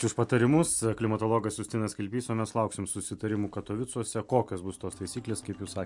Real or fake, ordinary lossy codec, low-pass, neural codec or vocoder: real; MP3, 64 kbps; 10.8 kHz; none